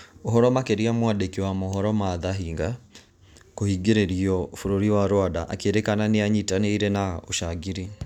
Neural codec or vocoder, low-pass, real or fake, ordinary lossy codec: none; 19.8 kHz; real; none